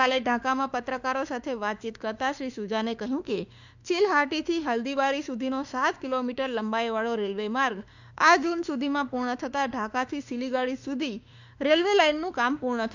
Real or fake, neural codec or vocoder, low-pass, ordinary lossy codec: fake; autoencoder, 48 kHz, 32 numbers a frame, DAC-VAE, trained on Japanese speech; 7.2 kHz; none